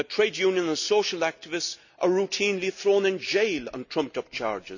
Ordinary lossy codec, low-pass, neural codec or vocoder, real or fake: none; 7.2 kHz; none; real